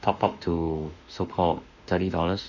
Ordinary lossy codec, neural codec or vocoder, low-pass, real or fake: none; autoencoder, 48 kHz, 32 numbers a frame, DAC-VAE, trained on Japanese speech; 7.2 kHz; fake